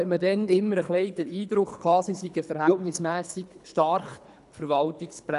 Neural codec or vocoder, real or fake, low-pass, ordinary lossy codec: codec, 24 kHz, 3 kbps, HILCodec; fake; 10.8 kHz; none